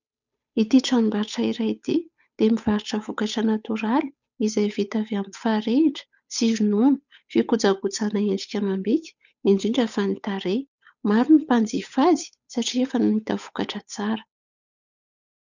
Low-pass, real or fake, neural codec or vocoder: 7.2 kHz; fake; codec, 16 kHz, 8 kbps, FunCodec, trained on Chinese and English, 25 frames a second